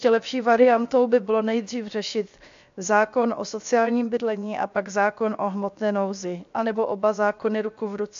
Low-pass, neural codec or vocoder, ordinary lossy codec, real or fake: 7.2 kHz; codec, 16 kHz, 0.7 kbps, FocalCodec; AAC, 64 kbps; fake